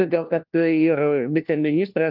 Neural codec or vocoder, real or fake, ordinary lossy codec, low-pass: codec, 16 kHz, 1 kbps, FunCodec, trained on LibriTTS, 50 frames a second; fake; Opus, 24 kbps; 5.4 kHz